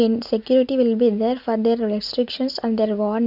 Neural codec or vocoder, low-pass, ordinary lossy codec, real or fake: none; 5.4 kHz; none; real